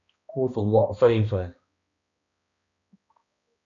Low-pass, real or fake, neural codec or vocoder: 7.2 kHz; fake; codec, 16 kHz, 1 kbps, X-Codec, HuBERT features, trained on balanced general audio